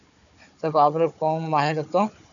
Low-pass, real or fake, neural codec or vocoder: 7.2 kHz; fake; codec, 16 kHz, 4 kbps, FunCodec, trained on Chinese and English, 50 frames a second